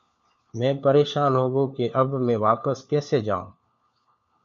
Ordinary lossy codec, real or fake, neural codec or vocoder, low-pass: MP3, 64 kbps; fake; codec, 16 kHz, 4 kbps, FunCodec, trained on LibriTTS, 50 frames a second; 7.2 kHz